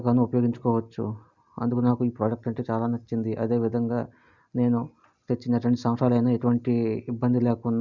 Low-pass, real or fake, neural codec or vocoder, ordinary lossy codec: 7.2 kHz; real; none; none